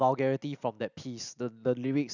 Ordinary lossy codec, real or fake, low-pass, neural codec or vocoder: none; real; 7.2 kHz; none